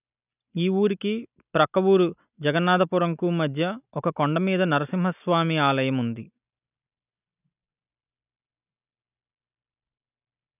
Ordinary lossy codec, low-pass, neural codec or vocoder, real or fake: none; 3.6 kHz; none; real